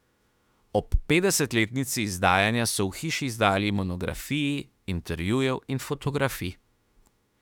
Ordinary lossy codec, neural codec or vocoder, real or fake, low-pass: none; autoencoder, 48 kHz, 32 numbers a frame, DAC-VAE, trained on Japanese speech; fake; 19.8 kHz